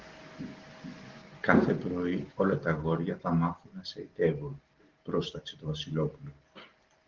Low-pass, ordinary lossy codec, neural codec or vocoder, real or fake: 7.2 kHz; Opus, 16 kbps; none; real